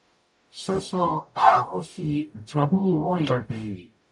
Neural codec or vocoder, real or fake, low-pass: codec, 44.1 kHz, 0.9 kbps, DAC; fake; 10.8 kHz